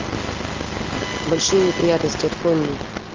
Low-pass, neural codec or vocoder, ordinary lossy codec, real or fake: 7.2 kHz; none; Opus, 32 kbps; real